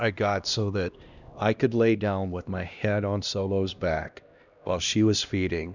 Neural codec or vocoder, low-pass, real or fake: codec, 16 kHz, 1 kbps, X-Codec, HuBERT features, trained on LibriSpeech; 7.2 kHz; fake